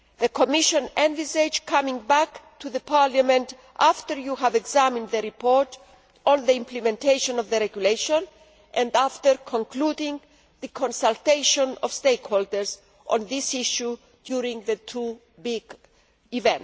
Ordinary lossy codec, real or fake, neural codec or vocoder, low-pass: none; real; none; none